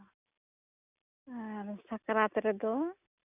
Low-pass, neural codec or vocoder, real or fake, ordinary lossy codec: 3.6 kHz; none; real; none